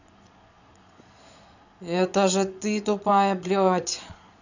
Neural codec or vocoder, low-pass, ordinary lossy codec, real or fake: vocoder, 22.05 kHz, 80 mel bands, Vocos; 7.2 kHz; none; fake